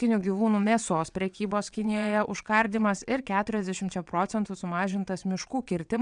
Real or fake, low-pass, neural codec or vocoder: fake; 9.9 kHz; vocoder, 22.05 kHz, 80 mel bands, WaveNeXt